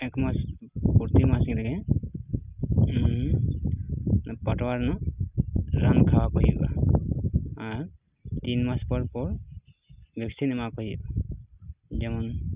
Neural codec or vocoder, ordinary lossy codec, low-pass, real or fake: none; Opus, 64 kbps; 3.6 kHz; real